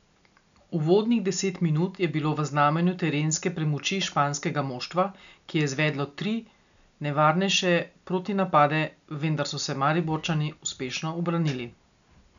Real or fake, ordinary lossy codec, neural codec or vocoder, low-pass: real; MP3, 96 kbps; none; 7.2 kHz